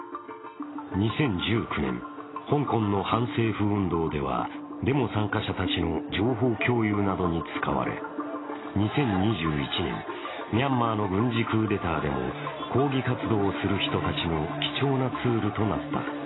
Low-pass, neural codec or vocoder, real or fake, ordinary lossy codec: 7.2 kHz; none; real; AAC, 16 kbps